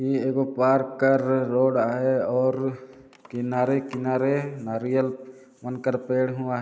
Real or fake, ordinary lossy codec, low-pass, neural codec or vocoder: real; none; none; none